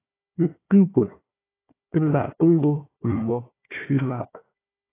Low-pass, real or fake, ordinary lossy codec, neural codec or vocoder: 3.6 kHz; fake; AAC, 16 kbps; codec, 16 kHz, 1 kbps, FunCodec, trained on Chinese and English, 50 frames a second